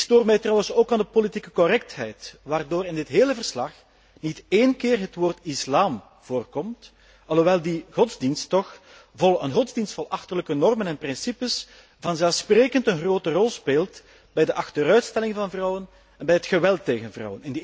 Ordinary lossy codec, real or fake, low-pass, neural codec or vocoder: none; real; none; none